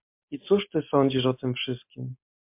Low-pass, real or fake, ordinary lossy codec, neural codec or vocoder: 3.6 kHz; real; MP3, 32 kbps; none